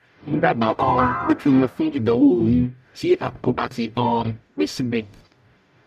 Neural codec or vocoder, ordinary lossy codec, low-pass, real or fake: codec, 44.1 kHz, 0.9 kbps, DAC; none; 14.4 kHz; fake